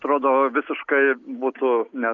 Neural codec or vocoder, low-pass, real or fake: none; 7.2 kHz; real